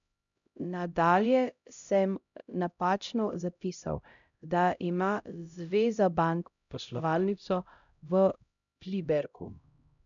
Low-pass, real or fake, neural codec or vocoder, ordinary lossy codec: 7.2 kHz; fake; codec, 16 kHz, 0.5 kbps, X-Codec, HuBERT features, trained on LibriSpeech; none